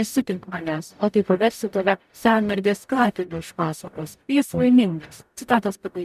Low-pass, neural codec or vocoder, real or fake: 14.4 kHz; codec, 44.1 kHz, 0.9 kbps, DAC; fake